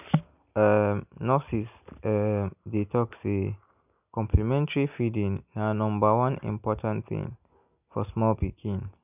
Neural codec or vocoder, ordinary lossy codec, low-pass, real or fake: none; none; 3.6 kHz; real